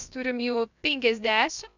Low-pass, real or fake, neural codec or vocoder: 7.2 kHz; fake; codec, 16 kHz, about 1 kbps, DyCAST, with the encoder's durations